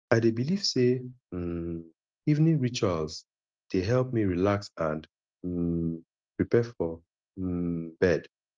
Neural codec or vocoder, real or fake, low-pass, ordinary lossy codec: none; real; 7.2 kHz; Opus, 32 kbps